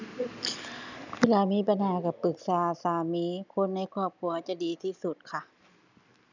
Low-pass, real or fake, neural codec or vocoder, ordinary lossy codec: 7.2 kHz; real; none; none